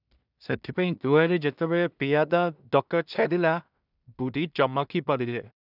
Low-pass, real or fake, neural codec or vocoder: 5.4 kHz; fake; codec, 16 kHz in and 24 kHz out, 0.4 kbps, LongCat-Audio-Codec, two codebook decoder